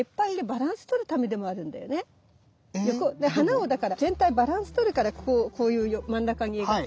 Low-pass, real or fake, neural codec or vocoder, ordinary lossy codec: none; real; none; none